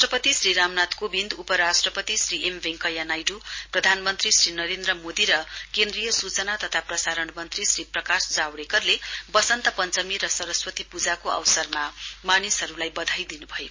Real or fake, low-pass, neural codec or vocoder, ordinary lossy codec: real; 7.2 kHz; none; MP3, 32 kbps